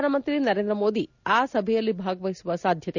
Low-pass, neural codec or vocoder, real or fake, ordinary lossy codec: 7.2 kHz; none; real; none